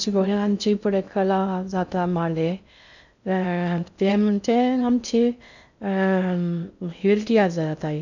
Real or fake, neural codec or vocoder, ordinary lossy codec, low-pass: fake; codec, 16 kHz in and 24 kHz out, 0.6 kbps, FocalCodec, streaming, 2048 codes; none; 7.2 kHz